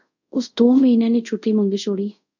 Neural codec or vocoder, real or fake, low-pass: codec, 24 kHz, 0.5 kbps, DualCodec; fake; 7.2 kHz